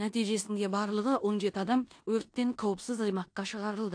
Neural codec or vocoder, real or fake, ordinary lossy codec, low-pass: codec, 16 kHz in and 24 kHz out, 0.9 kbps, LongCat-Audio-Codec, four codebook decoder; fake; AAC, 48 kbps; 9.9 kHz